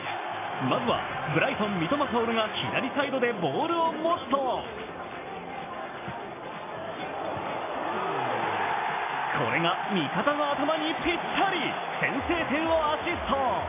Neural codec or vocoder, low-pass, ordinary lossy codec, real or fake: none; 3.6 kHz; AAC, 16 kbps; real